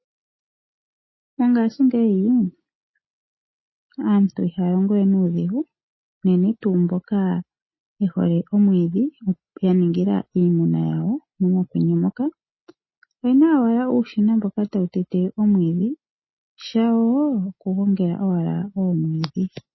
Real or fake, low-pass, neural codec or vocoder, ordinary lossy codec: real; 7.2 kHz; none; MP3, 24 kbps